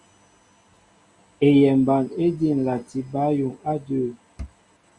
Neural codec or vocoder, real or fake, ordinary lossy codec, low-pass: none; real; Opus, 64 kbps; 10.8 kHz